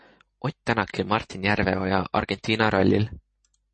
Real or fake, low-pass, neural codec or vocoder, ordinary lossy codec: real; 9.9 kHz; none; MP3, 32 kbps